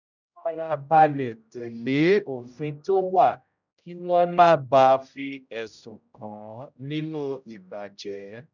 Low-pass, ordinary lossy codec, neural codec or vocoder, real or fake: 7.2 kHz; none; codec, 16 kHz, 0.5 kbps, X-Codec, HuBERT features, trained on general audio; fake